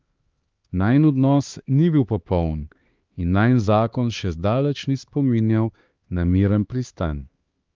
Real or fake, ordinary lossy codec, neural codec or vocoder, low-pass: fake; Opus, 32 kbps; codec, 16 kHz, 2 kbps, X-Codec, HuBERT features, trained on LibriSpeech; 7.2 kHz